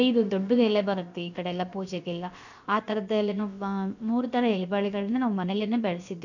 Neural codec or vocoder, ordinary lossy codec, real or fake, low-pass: codec, 16 kHz, about 1 kbps, DyCAST, with the encoder's durations; none; fake; 7.2 kHz